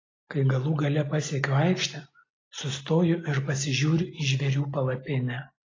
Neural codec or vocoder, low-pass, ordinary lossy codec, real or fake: none; 7.2 kHz; AAC, 32 kbps; real